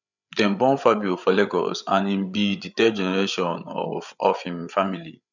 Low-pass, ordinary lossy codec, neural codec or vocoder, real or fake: 7.2 kHz; none; codec, 16 kHz, 8 kbps, FreqCodec, larger model; fake